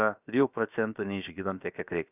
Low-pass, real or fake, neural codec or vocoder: 3.6 kHz; fake; codec, 16 kHz, 0.7 kbps, FocalCodec